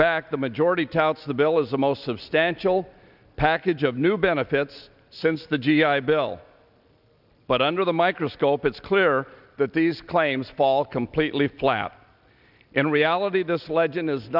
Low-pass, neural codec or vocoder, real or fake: 5.4 kHz; none; real